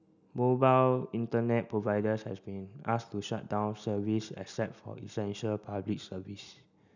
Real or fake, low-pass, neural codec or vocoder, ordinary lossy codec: real; 7.2 kHz; none; none